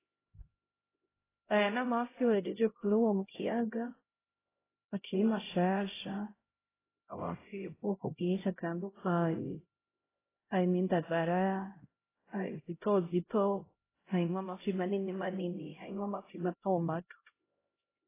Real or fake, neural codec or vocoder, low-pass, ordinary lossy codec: fake; codec, 16 kHz, 0.5 kbps, X-Codec, HuBERT features, trained on LibriSpeech; 3.6 kHz; AAC, 16 kbps